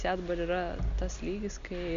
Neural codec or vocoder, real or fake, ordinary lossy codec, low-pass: none; real; MP3, 64 kbps; 7.2 kHz